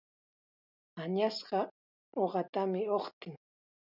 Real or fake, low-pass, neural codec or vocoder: real; 5.4 kHz; none